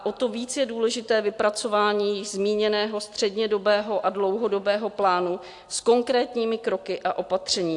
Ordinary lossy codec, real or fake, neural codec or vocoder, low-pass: AAC, 64 kbps; real; none; 10.8 kHz